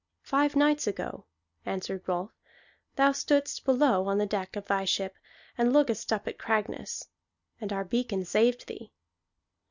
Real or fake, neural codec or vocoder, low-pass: real; none; 7.2 kHz